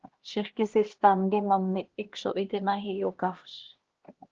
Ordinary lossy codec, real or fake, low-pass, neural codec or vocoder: Opus, 16 kbps; fake; 7.2 kHz; codec, 16 kHz, 1 kbps, X-Codec, HuBERT features, trained on LibriSpeech